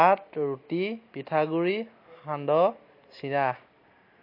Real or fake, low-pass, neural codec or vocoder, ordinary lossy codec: real; 5.4 kHz; none; MP3, 32 kbps